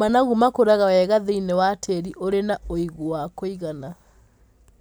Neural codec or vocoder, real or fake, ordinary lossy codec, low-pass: none; real; none; none